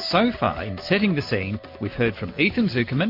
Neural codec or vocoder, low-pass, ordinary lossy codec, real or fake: none; 5.4 kHz; MP3, 32 kbps; real